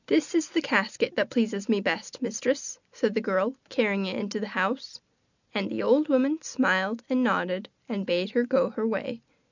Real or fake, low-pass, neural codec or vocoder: real; 7.2 kHz; none